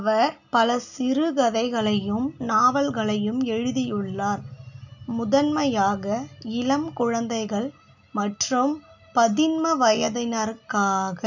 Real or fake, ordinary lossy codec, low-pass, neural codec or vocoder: real; none; 7.2 kHz; none